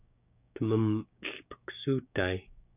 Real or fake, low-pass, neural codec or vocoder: fake; 3.6 kHz; codec, 16 kHz, 6 kbps, DAC